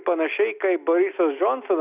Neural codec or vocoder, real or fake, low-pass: none; real; 3.6 kHz